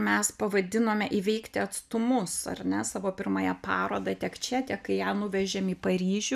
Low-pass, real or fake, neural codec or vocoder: 14.4 kHz; real; none